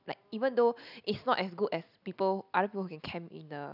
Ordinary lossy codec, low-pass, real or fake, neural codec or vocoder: none; 5.4 kHz; real; none